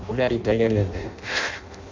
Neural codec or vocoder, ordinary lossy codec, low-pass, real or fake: codec, 16 kHz in and 24 kHz out, 0.6 kbps, FireRedTTS-2 codec; MP3, 64 kbps; 7.2 kHz; fake